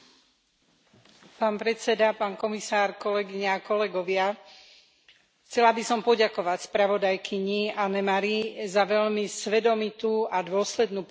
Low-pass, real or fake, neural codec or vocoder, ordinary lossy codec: none; real; none; none